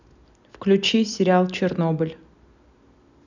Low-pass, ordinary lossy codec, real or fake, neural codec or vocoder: 7.2 kHz; none; real; none